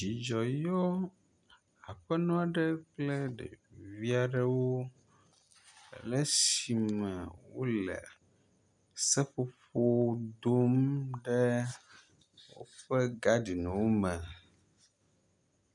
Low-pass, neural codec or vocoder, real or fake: 10.8 kHz; none; real